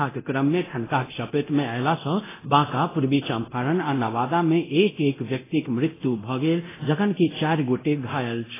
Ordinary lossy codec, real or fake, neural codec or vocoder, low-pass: AAC, 16 kbps; fake; codec, 24 kHz, 0.9 kbps, DualCodec; 3.6 kHz